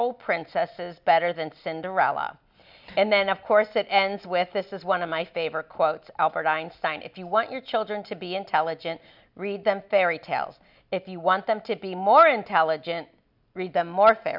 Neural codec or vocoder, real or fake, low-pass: none; real; 5.4 kHz